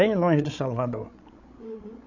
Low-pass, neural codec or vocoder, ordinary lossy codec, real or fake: 7.2 kHz; codec, 16 kHz, 16 kbps, FreqCodec, larger model; none; fake